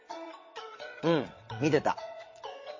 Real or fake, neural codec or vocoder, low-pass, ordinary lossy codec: fake; vocoder, 22.05 kHz, 80 mel bands, WaveNeXt; 7.2 kHz; MP3, 32 kbps